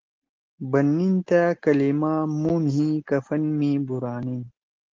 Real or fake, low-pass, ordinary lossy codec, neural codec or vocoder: real; 7.2 kHz; Opus, 16 kbps; none